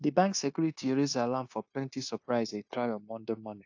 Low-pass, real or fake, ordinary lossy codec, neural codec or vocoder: 7.2 kHz; fake; AAC, 48 kbps; codec, 16 kHz, 0.9 kbps, LongCat-Audio-Codec